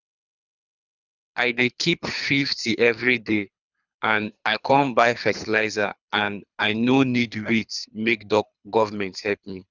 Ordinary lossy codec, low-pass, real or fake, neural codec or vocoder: none; 7.2 kHz; fake; codec, 24 kHz, 3 kbps, HILCodec